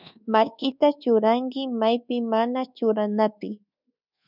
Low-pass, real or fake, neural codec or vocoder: 5.4 kHz; fake; codec, 24 kHz, 1.2 kbps, DualCodec